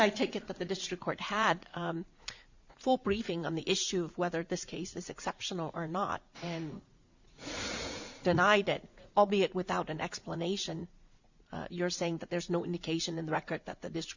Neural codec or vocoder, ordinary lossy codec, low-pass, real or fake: vocoder, 44.1 kHz, 80 mel bands, Vocos; Opus, 64 kbps; 7.2 kHz; fake